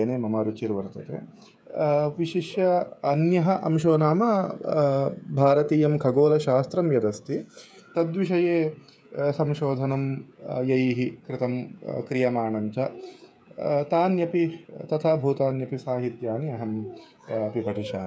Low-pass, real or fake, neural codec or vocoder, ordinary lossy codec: none; fake; codec, 16 kHz, 16 kbps, FreqCodec, smaller model; none